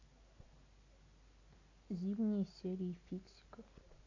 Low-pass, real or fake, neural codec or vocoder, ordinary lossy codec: 7.2 kHz; real; none; AAC, 32 kbps